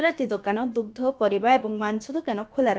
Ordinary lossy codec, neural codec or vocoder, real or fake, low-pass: none; codec, 16 kHz, about 1 kbps, DyCAST, with the encoder's durations; fake; none